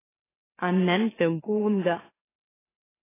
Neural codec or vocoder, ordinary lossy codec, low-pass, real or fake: autoencoder, 44.1 kHz, a latent of 192 numbers a frame, MeloTTS; AAC, 16 kbps; 3.6 kHz; fake